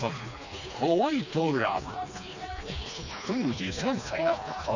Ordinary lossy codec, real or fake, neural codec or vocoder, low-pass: none; fake; codec, 16 kHz, 2 kbps, FreqCodec, smaller model; 7.2 kHz